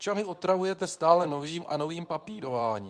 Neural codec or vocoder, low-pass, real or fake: codec, 24 kHz, 0.9 kbps, WavTokenizer, medium speech release version 2; 9.9 kHz; fake